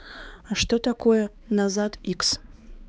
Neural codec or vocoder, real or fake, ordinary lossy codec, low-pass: codec, 16 kHz, 4 kbps, X-Codec, HuBERT features, trained on balanced general audio; fake; none; none